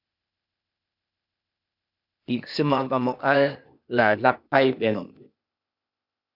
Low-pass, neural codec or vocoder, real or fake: 5.4 kHz; codec, 16 kHz, 0.8 kbps, ZipCodec; fake